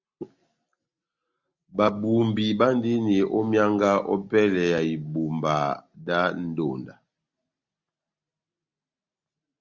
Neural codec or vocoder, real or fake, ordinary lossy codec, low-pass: none; real; Opus, 64 kbps; 7.2 kHz